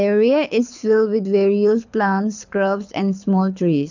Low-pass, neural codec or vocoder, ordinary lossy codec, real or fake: 7.2 kHz; codec, 24 kHz, 6 kbps, HILCodec; none; fake